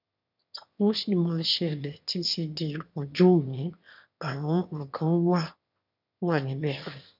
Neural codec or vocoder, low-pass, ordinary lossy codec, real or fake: autoencoder, 22.05 kHz, a latent of 192 numbers a frame, VITS, trained on one speaker; 5.4 kHz; none; fake